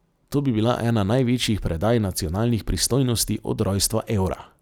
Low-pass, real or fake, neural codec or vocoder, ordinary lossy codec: none; real; none; none